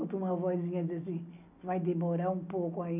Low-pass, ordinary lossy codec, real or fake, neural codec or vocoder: 3.6 kHz; none; real; none